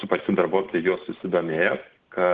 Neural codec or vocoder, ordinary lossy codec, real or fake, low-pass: none; Opus, 16 kbps; real; 7.2 kHz